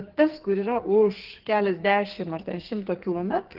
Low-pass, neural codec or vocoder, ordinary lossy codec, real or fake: 5.4 kHz; codec, 44.1 kHz, 2.6 kbps, SNAC; Opus, 16 kbps; fake